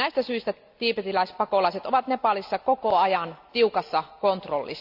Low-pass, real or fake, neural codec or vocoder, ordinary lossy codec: 5.4 kHz; real; none; AAC, 48 kbps